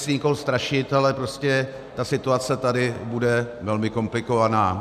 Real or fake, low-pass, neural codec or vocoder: real; 14.4 kHz; none